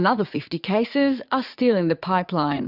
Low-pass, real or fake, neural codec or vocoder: 5.4 kHz; fake; vocoder, 44.1 kHz, 80 mel bands, Vocos